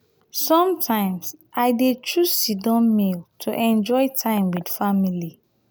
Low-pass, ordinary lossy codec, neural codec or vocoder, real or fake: none; none; none; real